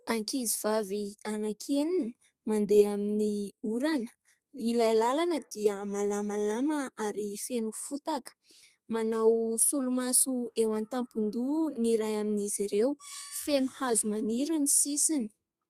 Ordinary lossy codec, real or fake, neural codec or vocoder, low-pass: Opus, 64 kbps; fake; codec, 32 kHz, 1.9 kbps, SNAC; 14.4 kHz